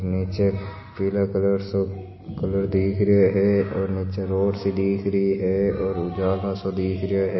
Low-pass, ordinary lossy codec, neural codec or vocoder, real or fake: 7.2 kHz; MP3, 24 kbps; none; real